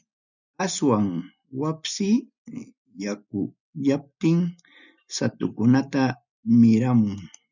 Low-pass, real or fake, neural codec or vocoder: 7.2 kHz; real; none